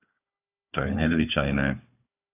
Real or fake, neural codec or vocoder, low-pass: fake; codec, 16 kHz, 4 kbps, FunCodec, trained on Chinese and English, 50 frames a second; 3.6 kHz